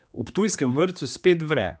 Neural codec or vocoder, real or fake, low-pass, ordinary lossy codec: codec, 16 kHz, 2 kbps, X-Codec, HuBERT features, trained on general audio; fake; none; none